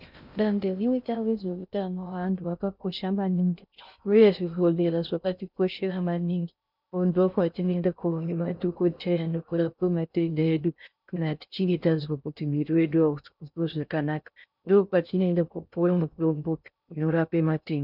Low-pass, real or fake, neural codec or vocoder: 5.4 kHz; fake; codec, 16 kHz in and 24 kHz out, 0.6 kbps, FocalCodec, streaming, 2048 codes